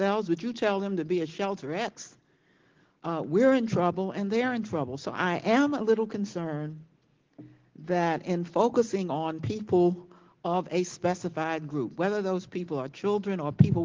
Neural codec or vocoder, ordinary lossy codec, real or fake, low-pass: none; Opus, 16 kbps; real; 7.2 kHz